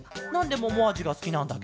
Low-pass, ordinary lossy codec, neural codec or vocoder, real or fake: none; none; none; real